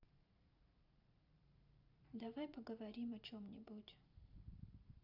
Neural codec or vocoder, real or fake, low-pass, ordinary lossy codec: vocoder, 44.1 kHz, 128 mel bands every 512 samples, BigVGAN v2; fake; 5.4 kHz; none